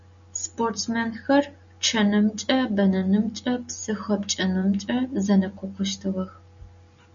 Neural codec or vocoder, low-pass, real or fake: none; 7.2 kHz; real